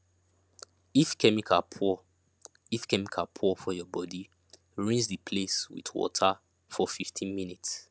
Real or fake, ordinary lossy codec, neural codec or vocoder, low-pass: real; none; none; none